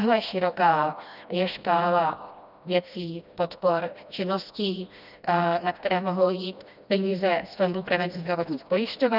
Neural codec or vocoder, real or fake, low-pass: codec, 16 kHz, 1 kbps, FreqCodec, smaller model; fake; 5.4 kHz